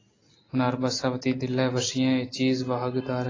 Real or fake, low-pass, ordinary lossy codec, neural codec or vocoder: real; 7.2 kHz; AAC, 32 kbps; none